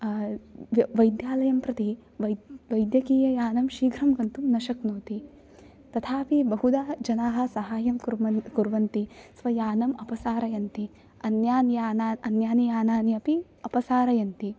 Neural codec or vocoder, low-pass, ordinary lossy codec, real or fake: none; none; none; real